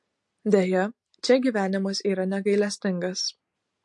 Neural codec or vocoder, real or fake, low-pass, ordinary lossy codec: none; real; 10.8 kHz; MP3, 48 kbps